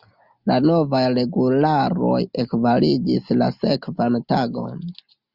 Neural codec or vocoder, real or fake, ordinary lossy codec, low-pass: none; real; Opus, 64 kbps; 5.4 kHz